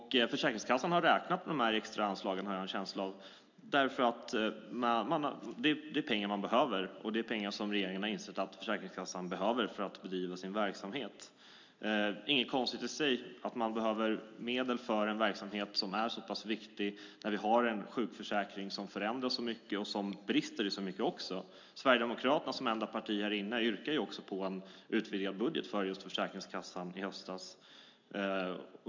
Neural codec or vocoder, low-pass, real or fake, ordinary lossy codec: none; 7.2 kHz; real; MP3, 64 kbps